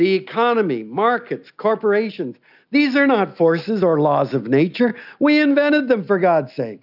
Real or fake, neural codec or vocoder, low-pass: real; none; 5.4 kHz